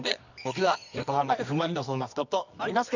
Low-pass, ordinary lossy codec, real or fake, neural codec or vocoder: 7.2 kHz; none; fake; codec, 24 kHz, 0.9 kbps, WavTokenizer, medium music audio release